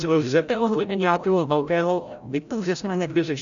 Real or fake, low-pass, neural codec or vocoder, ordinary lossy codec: fake; 7.2 kHz; codec, 16 kHz, 0.5 kbps, FreqCodec, larger model; MP3, 96 kbps